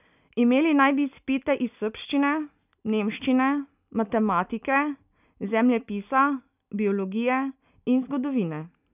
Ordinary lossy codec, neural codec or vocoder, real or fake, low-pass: none; none; real; 3.6 kHz